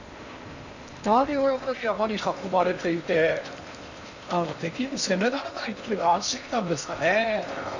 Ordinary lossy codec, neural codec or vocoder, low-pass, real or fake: none; codec, 16 kHz in and 24 kHz out, 0.8 kbps, FocalCodec, streaming, 65536 codes; 7.2 kHz; fake